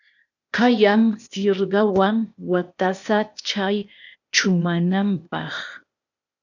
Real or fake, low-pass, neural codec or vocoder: fake; 7.2 kHz; codec, 16 kHz, 0.8 kbps, ZipCodec